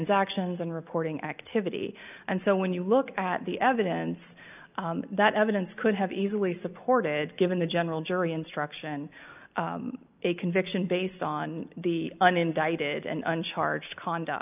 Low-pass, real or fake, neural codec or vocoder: 3.6 kHz; real; none